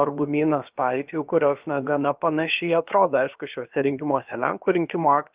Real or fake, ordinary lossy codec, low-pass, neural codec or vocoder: fake; Opus, 24 kbps; 3.6 kHz; codec, 16 kHz, about 1 kbps, DyCAST, with the encoder's durations